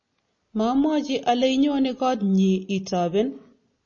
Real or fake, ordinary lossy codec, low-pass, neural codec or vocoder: real; MP3, 32 kbps; 7.2 kHz; none